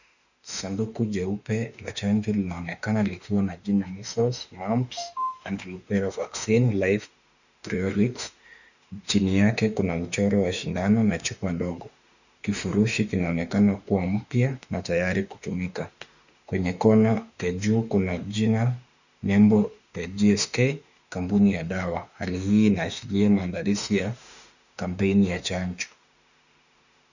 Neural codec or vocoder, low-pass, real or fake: autoencoder, 48 kHz, 32 numbers a frame, DAC-VAE, trained on Japanese speech; 7.2 kHz; fake